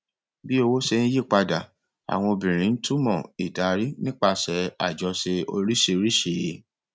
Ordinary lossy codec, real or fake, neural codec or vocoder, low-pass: none; real; none; none